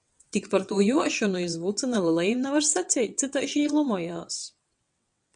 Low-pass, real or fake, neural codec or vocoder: 9.9 kHz; fake; vocoder, 22.05 kHz, 80 mel bands, WaveNeXt